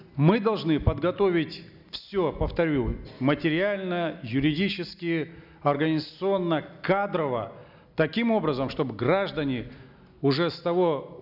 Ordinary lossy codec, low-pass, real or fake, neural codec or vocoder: none; 5.4 kHz; real; none